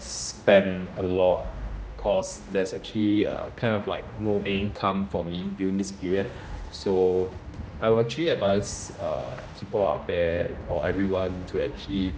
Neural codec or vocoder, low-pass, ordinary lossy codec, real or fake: codec, 16 kHz, 1 kbps, X-Codec, HuBERT features, trained on general audio; none; none; fake